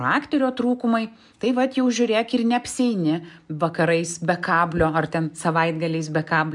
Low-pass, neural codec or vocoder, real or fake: 10.8 kHz; none; real